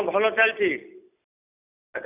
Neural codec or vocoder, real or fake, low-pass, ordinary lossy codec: none; real; 3.6 kHz; none